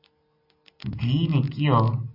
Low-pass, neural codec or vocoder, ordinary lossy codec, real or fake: 5.4 kHz; none; MP3, 48 kbps; real